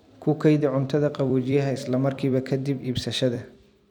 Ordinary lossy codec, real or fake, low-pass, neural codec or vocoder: none; fake; 19.8 kHz; vocoder, 44.1 kHz, 128 mel bands every 256 samples, BigVGAN v2